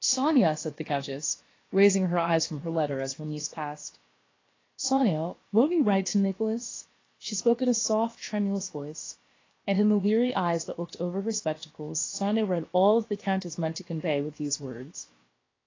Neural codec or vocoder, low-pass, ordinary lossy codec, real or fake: codec, 16 kHz, about 1 kbps, DyCAST, with the encoder's durations; 7.2 kHz; AAC, 32 kbps; fake